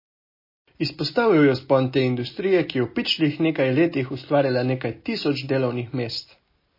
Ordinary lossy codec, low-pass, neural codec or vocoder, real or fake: MP3, 24 kbps; 5.4 kHz; none; real